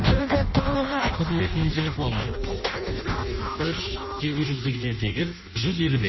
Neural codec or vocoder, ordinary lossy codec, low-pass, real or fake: codec, 16 kHz in and 24 kHz out, 0.6 kbps, FireRedTTS-2 codec; MP3, 24 kbps; 7.2 kHz; fake